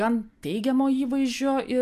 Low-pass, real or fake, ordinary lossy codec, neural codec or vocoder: 14.4 kHz; real; MP3, 96 kbps; none